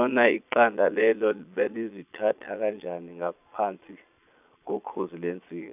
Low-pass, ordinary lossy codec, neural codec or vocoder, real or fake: 3.6 kHz; none; vocoder, 22.05 kHz, 80 mel bands, Vocos; fake